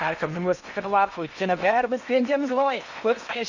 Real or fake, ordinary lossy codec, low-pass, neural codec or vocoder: fake; none; 7.2 kHz; codec, 16 kHz in and 24 kHz out, 0.8 kbps, FocalCodec, streaming, 65536 codes